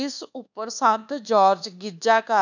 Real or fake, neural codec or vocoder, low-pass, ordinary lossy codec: fake; codec, 24 kHz, 1.2 kbps, DualCodec; 7.2 kHz; none